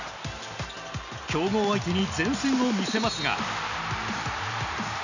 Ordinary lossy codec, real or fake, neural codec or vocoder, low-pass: none; real; none; 7.2 kHz